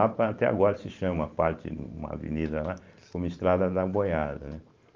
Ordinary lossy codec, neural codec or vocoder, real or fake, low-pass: Opus, 24 kbps; vocoder, 44.1 kHz, 128 mel bands every 512 samples, BigVGAN v2; fake; 7.2 kHz